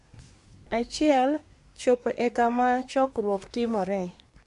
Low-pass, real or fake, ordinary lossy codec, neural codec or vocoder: 10.8 kHz; fake; none; codec, 24 kHz, 1 kbps, SNAC